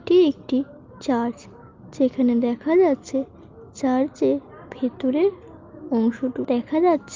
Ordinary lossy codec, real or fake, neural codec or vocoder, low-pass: Opus, 24 kbps; real; none; 7.2 kHz